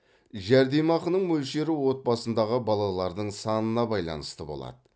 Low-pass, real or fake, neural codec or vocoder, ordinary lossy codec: none; real; none; none